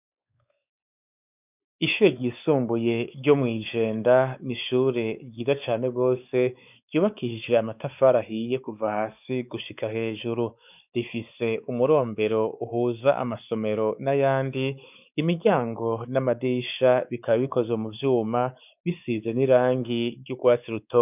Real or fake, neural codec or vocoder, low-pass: fake; codec, 16 kHz, 4 kbps, X-Codec, WavLM features, trained on Multilingual LibriSpeech; 3.6 kHz